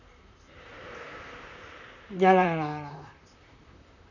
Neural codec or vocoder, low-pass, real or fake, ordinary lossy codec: vocoder, 44.1 kHz, 128 mel bands, Pupu-Vocoder; 7.2 kHz; fake; none